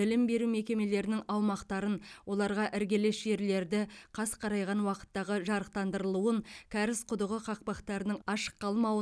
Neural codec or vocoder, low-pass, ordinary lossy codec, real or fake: none; none; none; real